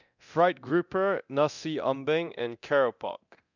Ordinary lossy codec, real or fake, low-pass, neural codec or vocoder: none; fake; 7.2 kHz; codec, 24 kHz, 0.9 kbps, DualCodec